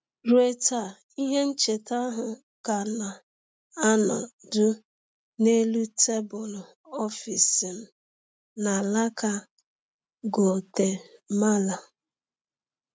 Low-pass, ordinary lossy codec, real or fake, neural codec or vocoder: none; none; real; none